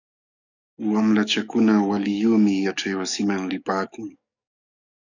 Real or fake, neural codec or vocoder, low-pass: fake; codec, 44.1 kHz, 7.8 kbps, DAC; 7.2 kHz